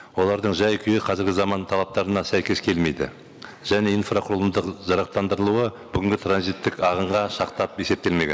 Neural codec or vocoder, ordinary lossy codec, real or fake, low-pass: none; none; real; none